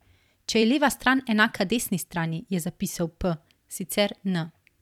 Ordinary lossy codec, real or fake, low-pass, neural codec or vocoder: none; fake; 19.8 kHz; vocoder, 44.1 kHz, 128 mel bands every 256 samples, BigVGAN v2